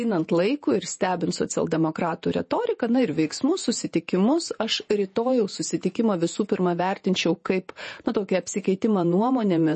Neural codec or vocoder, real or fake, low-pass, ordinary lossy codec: vocoder, 48 kHz, 128 mel bands, Vocos; fake; 10.8 kHz; MP3, 32 kbps